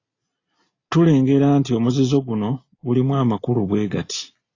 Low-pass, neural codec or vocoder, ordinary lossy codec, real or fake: 7.2 kHz; none; AAC, 32 kbps; real